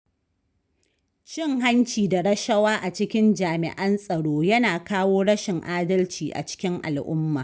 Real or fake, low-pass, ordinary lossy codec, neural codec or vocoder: real; none; none; none